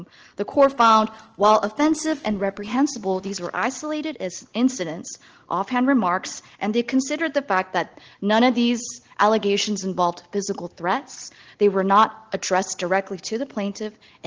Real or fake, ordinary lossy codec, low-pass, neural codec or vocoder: real; Opus, 16 kbps; 7.2 kHz; none